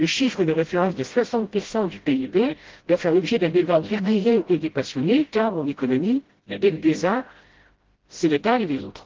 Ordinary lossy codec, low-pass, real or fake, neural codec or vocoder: Opus, 16 kbps; 7.2 kHz; fake; codec, 16 kHz, 0.5 kbps, FreqCodec, smaller model